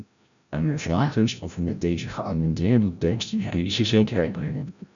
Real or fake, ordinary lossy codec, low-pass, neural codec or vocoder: fake; MP3, 96 kbps; 7.2 kHz; codec, 16 kHz, 0.5 kbps, FreqCodec, larger model